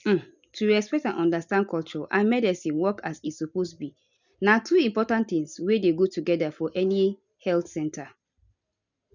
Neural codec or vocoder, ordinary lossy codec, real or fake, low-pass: none; none; real; 7.2 kHz